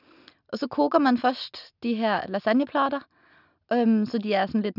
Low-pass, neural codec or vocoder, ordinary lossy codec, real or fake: 5.4 kHz; none; none; real